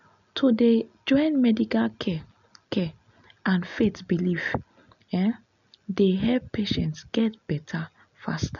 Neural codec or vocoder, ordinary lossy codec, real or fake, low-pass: none; none; real; 7.2 kHz